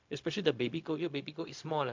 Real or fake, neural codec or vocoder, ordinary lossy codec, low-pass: fake; codec, 16 kHz, 0.4 kbps, LongCat-Audio-Codec; MP3, 64 kbps; 7.2 kHz